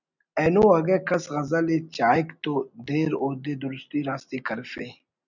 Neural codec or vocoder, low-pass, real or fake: none; 7.2 kHz; real